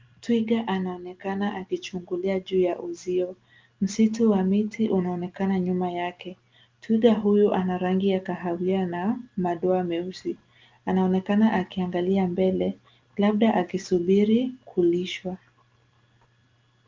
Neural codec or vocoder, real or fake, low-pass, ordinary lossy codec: none; real; 7.2 kHz; Opus, 32 kbps